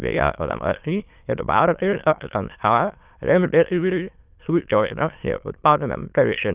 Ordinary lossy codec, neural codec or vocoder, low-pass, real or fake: Opus, 24 kbps; autoencoder, 22.05 kHz, a latent of 192 numbers a frame, VITS, trained on many speakers; 3.6 kHz; fake